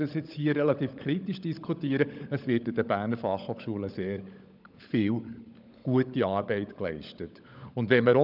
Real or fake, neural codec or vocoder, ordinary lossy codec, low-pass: fake; codec, 16 kHz, 16 kbps, FunCodec, trained on LibriTTS, 50 frames a second; none; 5.4 kHz